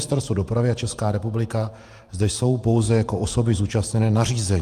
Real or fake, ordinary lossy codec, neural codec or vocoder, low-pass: fake; Opus, 32 kbps; vocoder, 48 kHz, 128 mel bands, Vocos; 14.4 kHz